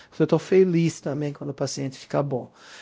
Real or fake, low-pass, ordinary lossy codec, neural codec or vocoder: fake; none; none; codec, 16 kHz, 0.5 kbps, X-Codec, WavLM features, trained on Multilingual LibriSpeech